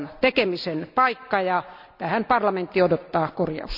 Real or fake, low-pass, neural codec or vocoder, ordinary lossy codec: real; 5.4 kHz; none; none